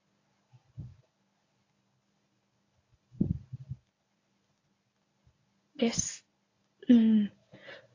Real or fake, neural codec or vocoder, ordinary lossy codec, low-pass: fake; codec, 24 kHz, 0.9 kbps, WavTokenizer, medium speech release version 1; AAC, 32 kbps; 7.2 kHz